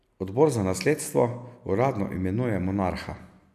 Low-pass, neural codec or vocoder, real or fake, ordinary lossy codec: 14.4 kHz; none; real; AAC, 96 kbps